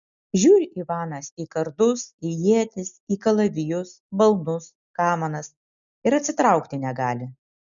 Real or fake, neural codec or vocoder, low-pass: real; none; 7.2 kHz